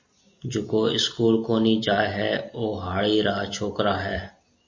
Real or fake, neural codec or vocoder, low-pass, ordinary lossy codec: real; none; 7.2 kHz; MP3, 32 kbps